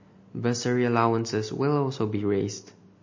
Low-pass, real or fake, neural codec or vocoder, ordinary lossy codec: 7.2 kHz; real; none; MP3, 32 kbps